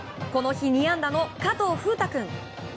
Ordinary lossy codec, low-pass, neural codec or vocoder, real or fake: none; none; none; real